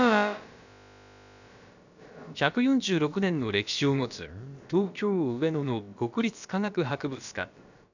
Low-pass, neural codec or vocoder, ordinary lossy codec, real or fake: 7.2 kHz; codec, 16 kHz, about 1 kbps, DyCAST, with the encoder's durations; none; fake